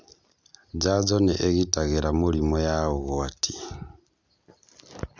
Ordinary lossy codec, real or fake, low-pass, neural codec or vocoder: none; real; none; none